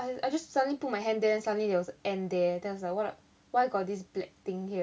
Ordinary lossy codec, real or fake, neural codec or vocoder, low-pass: none; real; none; none